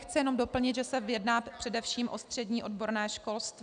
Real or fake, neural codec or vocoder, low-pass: real; none; 9.9 kHz